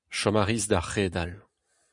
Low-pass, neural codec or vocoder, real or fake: 10.8 kHz; none; real